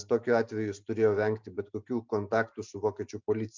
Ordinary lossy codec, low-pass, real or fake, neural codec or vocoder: MP3, 64 kbps; 7.2 kHz; real; none